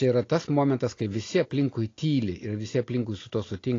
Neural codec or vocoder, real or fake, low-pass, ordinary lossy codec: none; real; 7.2 kHz; AAC, 32 kbps